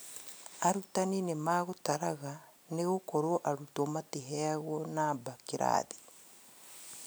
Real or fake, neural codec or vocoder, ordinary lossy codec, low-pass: real; none; none; none